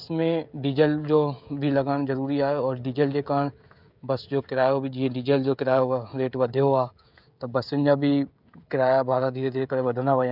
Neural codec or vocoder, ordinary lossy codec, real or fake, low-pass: codec, 16 kHz, 16 kbps, FreqCodec, smaller model; none; fake; 5.4 kHz